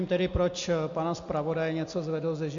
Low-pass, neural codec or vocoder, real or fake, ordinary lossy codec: 7.2 kHz; none; real; MP3, 48 kbps